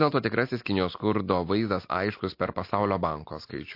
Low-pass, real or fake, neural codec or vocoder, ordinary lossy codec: 5.4 kHz; real; none; MP3, 32 kbps